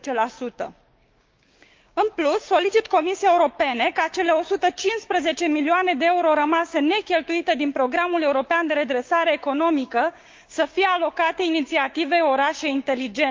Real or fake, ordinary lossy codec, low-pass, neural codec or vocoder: fake; Opus, 32 kbps; 7.2 kHz; codec, 16 kHz, 6 kbps, DAC